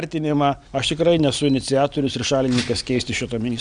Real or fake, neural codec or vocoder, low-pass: real; none; 9.9 kHz